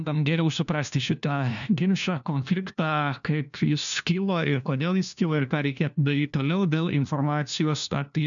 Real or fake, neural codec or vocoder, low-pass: fake; codec, 16 kHz, 1 kbps, FunCodec, trained on LibriTTS, 50 frames a second; 7.2 kHz